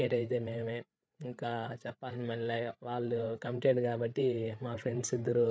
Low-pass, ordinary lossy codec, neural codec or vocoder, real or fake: none; none; codec, 16 kHz, 8 kbps, FreqCodec, larger model; fake